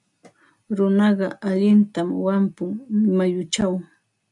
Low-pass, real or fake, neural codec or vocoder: 10.8 kHz; real; none